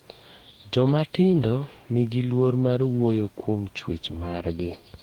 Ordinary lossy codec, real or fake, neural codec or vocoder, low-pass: Opus, 24 kbps; fake; codec, 44.1 kHz, 2.6 kbps, DAC; 19.8 kHz